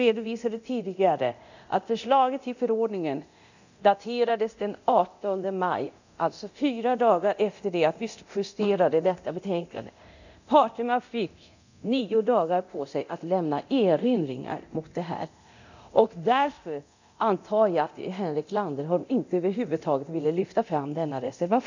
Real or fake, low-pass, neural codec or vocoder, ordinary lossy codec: fake; 7.2 kHz; codec, 24 kHz, 0.9 kbps, DualCodec; none